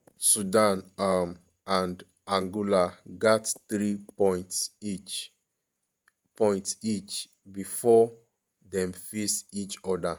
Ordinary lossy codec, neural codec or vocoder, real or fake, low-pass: none; vocoder, 48 kHz, 128 mel bands, Vocos; fake; none